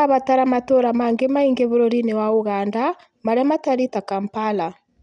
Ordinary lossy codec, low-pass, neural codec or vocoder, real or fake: none; 10.8 kHz; none; real